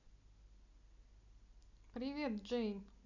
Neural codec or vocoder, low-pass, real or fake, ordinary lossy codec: none; 7.2 kHz; real; none